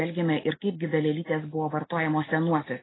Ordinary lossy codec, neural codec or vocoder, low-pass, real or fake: AAC, 16 kbps; none; 7.2 kHz; real